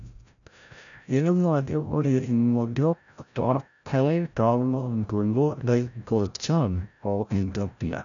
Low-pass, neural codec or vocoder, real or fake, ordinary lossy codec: 7.2 kHz; codec, 16 kHz, 0.5 kbps, FreqCodec, larger model; fake; none